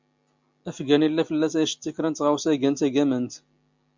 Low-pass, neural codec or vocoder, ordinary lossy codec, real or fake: 7.2 kHz; none; MP3, 64 kbps; real